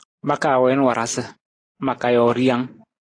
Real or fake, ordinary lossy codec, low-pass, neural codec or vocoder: real; AAC, 32 kbps; 9.9 kHz; none